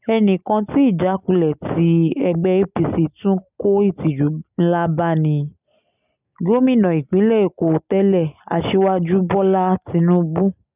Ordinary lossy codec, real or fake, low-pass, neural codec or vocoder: none; real; 3.6 kHz; none